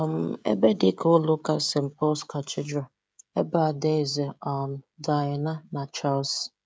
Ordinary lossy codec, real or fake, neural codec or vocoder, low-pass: none; fake; codec, 16 kHz, 16 kbps, FreqCodec, smaller model; none